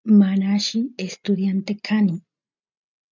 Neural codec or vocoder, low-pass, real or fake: none; 7.2 kHz; real